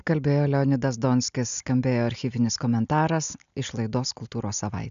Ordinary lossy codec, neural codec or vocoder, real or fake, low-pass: MP3, 96 kbps; none; real; 7.2 kHz